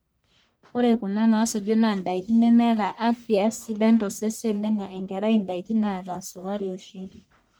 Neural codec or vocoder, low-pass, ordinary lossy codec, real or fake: codec, 44.1 kHz, 1.7 kbps, Pupu-Codec; none; none; fake